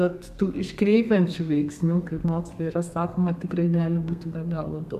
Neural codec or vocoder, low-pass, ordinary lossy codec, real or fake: codec, 32 kHz, 1.9 kbps, SNAC; 14.4 kHz; AAC, 96 kbps; fake